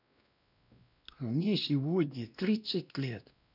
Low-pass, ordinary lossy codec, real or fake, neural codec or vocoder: 5.4 kHz; none; fake; codec, 16 kHz, 1 kbps, X-Codec, WavLM features, trained on Multilingual LibriSpeech